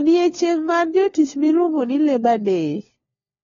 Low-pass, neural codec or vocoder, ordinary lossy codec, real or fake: 7.2 kHz; codec, 16 kHz, 4 kbps, FunCodec, trained on LibriTTS, 50 frames a second; AAC, 24 kbps; fake